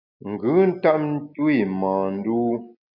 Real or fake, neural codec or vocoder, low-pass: real; none; 5.4 kHz